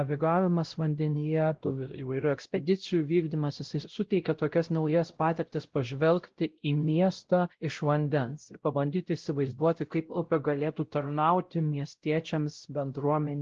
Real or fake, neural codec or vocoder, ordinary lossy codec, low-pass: fake; codec, 16 kHz, 0.5 kbps, X-Codec, WavLM features, trained on Multilingual LibriSpeech; Opus, 16 kbps; 7.2 kHz